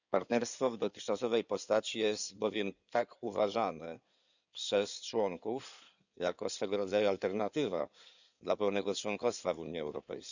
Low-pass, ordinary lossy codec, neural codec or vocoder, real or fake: 7.2 kHz; none; codec, 16 kHz in and 24 kHz out, 2.2 kbps, FireRedTTS-2 codec; fake